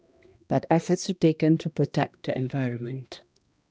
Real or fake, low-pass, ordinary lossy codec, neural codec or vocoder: fake; none; none; codec, 16 kHz, 1 kbps, X-Codec, HuBERT features, trained on balanced general audio